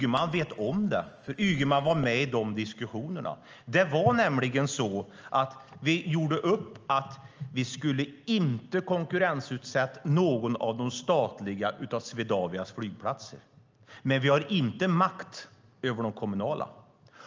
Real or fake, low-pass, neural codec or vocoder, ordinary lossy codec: real; 7.2 kHz; none; Opus, 24 kbps